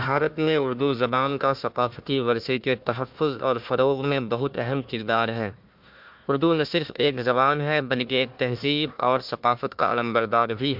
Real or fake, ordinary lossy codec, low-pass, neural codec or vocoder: fake; MP3, 48 kbps; 5.4 kHz; codec, 16 kHz, 1 kbps, FunCodec, trained on Chinese and English, 50 frames a second